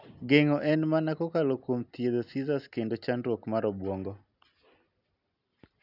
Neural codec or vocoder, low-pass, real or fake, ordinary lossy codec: none; 5.4 kHz; real; none